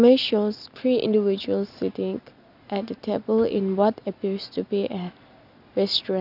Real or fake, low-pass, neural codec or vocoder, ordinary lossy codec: real; 5.4 kHz; none; none